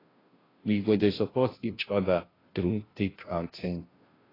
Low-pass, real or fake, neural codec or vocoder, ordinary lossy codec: 5.4 kHz; fake; codec, 16 kHz, 0.5 kbps, FunCodec, trained on Chinese and English, 25 frames a second; AAC, 24 kbps